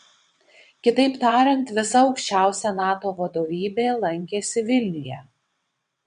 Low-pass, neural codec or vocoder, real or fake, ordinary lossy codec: 9.9 kHz; vocoder, 22.05 kHz, 80 mel bands, WaveNeXt; fake; MP3, 64 kbps